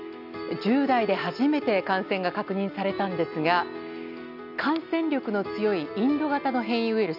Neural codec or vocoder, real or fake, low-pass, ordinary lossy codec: none; real; 5.4 kHz; none